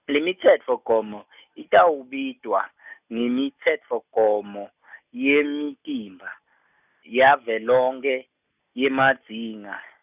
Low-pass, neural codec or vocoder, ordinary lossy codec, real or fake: 3.6 kHz; none; none; real